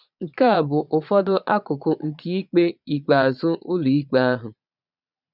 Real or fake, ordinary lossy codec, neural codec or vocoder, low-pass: fake; none; vocoder, 22.05 kHz, 80 mel bands, Vocos; 5.4 kHz